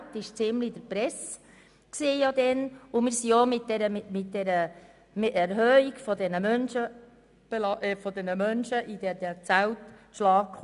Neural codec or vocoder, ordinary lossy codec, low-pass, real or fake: none; none; 10.8 kHz; real